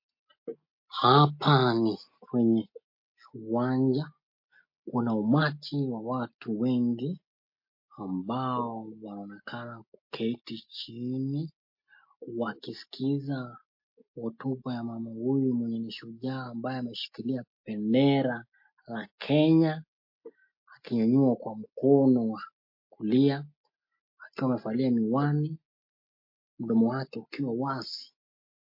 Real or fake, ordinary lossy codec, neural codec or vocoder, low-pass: real; MP3, 32 kbps; none; 5.4 kHz